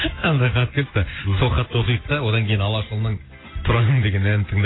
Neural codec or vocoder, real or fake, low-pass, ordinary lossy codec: none; real; 7.2 kHz; AAC, 16 kbps